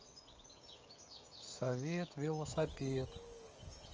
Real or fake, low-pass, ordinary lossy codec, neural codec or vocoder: fake; 7.2 kHz; Opus, 24 kbps; codec, 16 kHz, 16 kbps, FreqCodec, smaller model